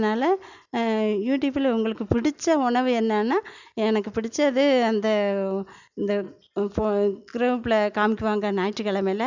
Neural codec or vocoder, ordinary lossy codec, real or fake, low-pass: none; none; real; 7.2 kHz